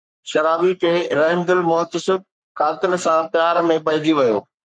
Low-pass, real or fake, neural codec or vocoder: 9.9 kHz; fake; codec, 44.1 kHz, 3.4 kbps, Pupu-Codec